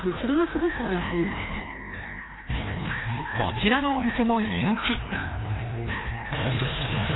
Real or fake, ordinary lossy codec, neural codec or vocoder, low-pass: fake; AAC, 16 kbps; codec, 16 kHz, 1 kbps, FreqCodec, larger model; 7.2 kHz